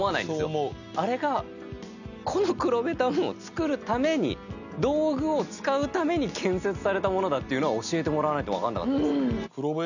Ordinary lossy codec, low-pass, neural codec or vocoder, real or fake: none; 7.2 kHz; none; real